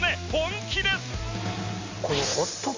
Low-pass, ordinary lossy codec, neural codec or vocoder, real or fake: 7.2 kHz; MP3, 48 kbps; none; real